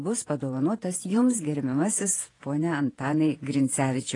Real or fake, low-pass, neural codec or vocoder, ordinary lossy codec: fake; 10.8 kHz; vocoder, 48 kHz, 128 mel bands, Vocos; AAC, 32 kbps